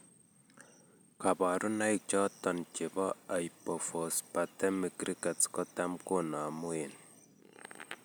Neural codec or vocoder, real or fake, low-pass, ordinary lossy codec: none; real; none; none